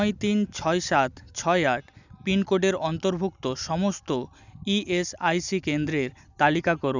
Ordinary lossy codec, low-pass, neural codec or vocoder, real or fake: none; 7.2 kHz; none; real